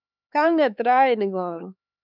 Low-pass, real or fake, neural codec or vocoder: 5.4 kHz; fake; codec, 16 kHz, 4 kbps, X-Codec, HuBERT features, trained on LibriSpeech